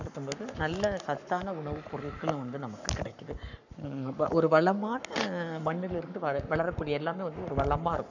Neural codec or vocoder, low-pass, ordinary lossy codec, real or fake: codec, 44.1 kHz, 7.8 kbps, Pupu-Codec; 7.2 kHz; none; fake